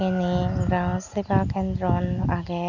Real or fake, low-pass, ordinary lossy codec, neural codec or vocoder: real; 7.2 kHz; none; none